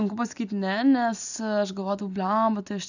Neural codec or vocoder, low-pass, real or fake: none; 7.2 kHz; real